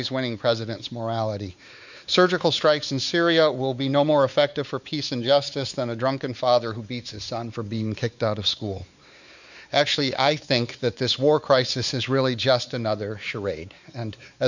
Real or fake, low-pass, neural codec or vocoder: fake; 7.2 kHz; codec, 16 kHz, 4 kbps, X-Codec, WavLM features, trained on Multilingual LibriSpeech